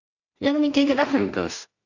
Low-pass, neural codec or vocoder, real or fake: 7.2 kHz; codec, 16 kHz in and 24 kHz out, 0.4 kbps, LongCat-Audio-Codec, two codebook decoder; fake